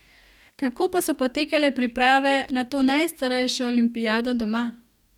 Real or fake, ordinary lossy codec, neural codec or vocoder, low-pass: fake; none; codec, 44.1 kHz, 2.6 kbps, DAC; 19.8 kHz